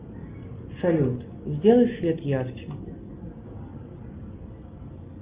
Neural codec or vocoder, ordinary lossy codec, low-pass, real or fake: none; Opus, 24 kbps; 3.6 kHz; real